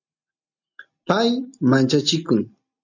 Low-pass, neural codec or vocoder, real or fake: 7.2 kHz; none; real